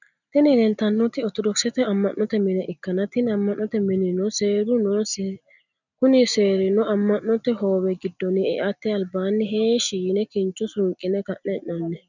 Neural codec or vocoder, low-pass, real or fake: none; 7.2 kHz; real